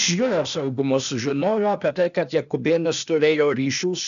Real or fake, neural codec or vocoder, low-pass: fake; codec, 16 kHz, 0.8 kbps, ZipCodec; 7.2 kHz